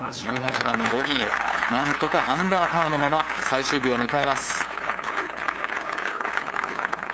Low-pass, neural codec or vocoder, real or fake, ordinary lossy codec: none; codec, 16 kHz, 2 kbps, FunCodec, trained on LibriTTS, 25 frames a second; fake; none